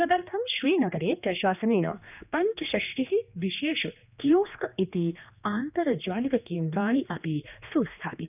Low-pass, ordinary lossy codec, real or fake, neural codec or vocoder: 3.6 kHz; none; fake; codec, 16 kHz, 2 kbps, X-Codec, HuBERT features, trained on general audio